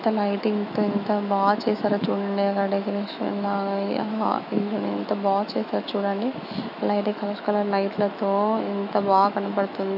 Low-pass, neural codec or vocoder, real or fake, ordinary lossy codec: 5.4 kHz; autoencoder, 48 kHz, 128 numbers a frame, DAC-VAE, trained on Japanese speech; fake; none